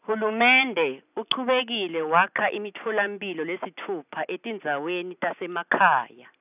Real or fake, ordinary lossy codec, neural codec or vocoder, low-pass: real; none; none; 3.6 kHz